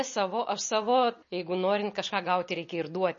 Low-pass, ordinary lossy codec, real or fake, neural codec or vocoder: 7.2 kHz; MP3, 32 kbps; real; none